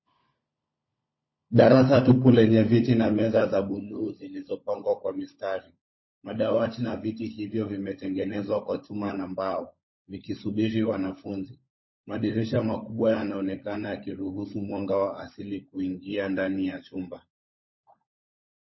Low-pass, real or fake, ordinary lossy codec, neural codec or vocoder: 7.2 kHz; fake; MP3, 24 kbps; codec, 16 kHz, 16 kbps, FunCodec, trained on LibriTTS, 50 frames a second